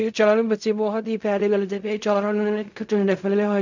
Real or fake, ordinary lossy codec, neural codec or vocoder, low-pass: fake; none; codec, 16 kHz in and 24 kHz out, 0.4 kbps, LongCat-Audio-Codec, fine tuned four codebook decoder; 7.2 kHz